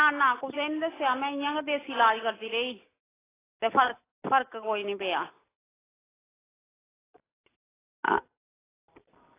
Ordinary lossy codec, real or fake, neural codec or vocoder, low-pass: AAC, 16 kbps; real; none; 3.6 kHz